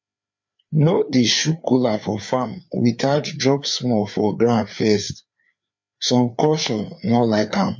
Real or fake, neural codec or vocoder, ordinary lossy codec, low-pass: fake; codec, 16 kHz, 4 kbps, FreqCodec, larger model; MP3, 48 kbps; 7.2 kHz